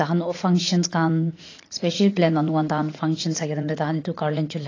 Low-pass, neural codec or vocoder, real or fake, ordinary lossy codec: 7.2 kHz; vocoder, 44.1 kHz, 80 mel bands, Vocos; fake; AAC, 32 kbps